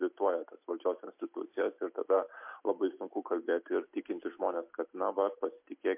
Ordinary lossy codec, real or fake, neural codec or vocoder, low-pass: MP3, 32 kbps; real; none; 3.6 kHz